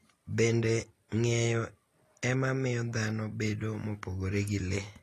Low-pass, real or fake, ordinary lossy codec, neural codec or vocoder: 14.4 kHz; real; AAC, 48 kbps; none